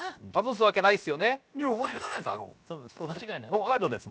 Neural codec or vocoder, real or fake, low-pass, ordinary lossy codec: codec, 16 kHz, 0.7 kbps, FocalCodec; fake; none; none